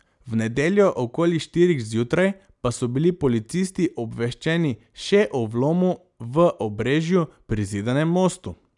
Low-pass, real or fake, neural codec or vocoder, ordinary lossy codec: 10.8 kHz; real; none; none